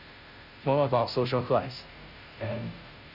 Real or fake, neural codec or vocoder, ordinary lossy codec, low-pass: fake; codec, 16 kHz, 0.5 kbps, FunCodec, trained on Chinese and English, 25 frames a second; none; 5.4 kHz